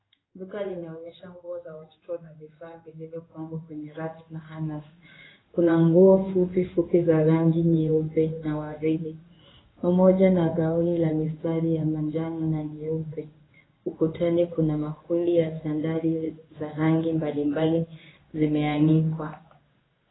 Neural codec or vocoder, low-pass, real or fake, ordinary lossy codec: codec, 16 kHz in and 24 kHz out, 1 kbps, XY-Tokenizer; 7.2 kHz; fake; AAC, 16 kbps